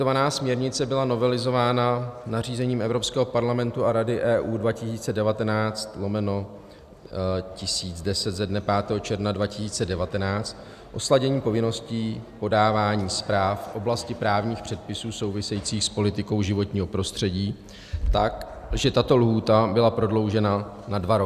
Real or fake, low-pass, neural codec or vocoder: real; 14.4 kHz; none